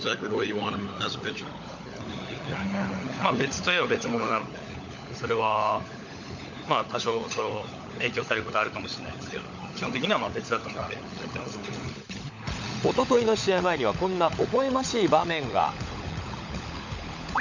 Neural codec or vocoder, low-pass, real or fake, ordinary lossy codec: codec, 16 kHz, 16 kbps, FunCodec, trained on LibriTTS, 50 frames a second; 7.2 kHz; fake; none